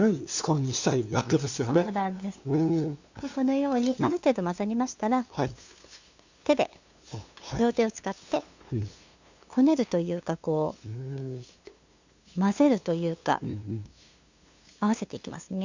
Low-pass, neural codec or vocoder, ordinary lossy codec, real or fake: 7.2 kHz; codec, 16 kHz, 2 kbps, FunCodec, trained on LibriTTS, 25 frames a second; Opus, 64 kbps; fake